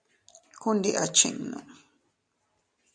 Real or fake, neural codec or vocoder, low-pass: real; none; 9.9 kHz